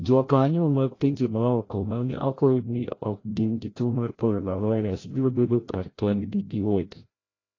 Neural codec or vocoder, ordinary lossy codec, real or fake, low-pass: codec, 16 kHz, 0.5 kbps, FreqCodec, larger model; AAC, 32 kbps; fake; 7.2 kHz